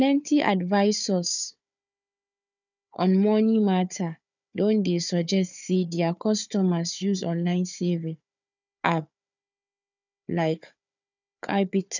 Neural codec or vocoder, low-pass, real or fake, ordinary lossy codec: codec, 16 kHz, 4 kbps, FunCodec, trained on Chinese and English, 50 frames a second; 7.2 kHz; fake; none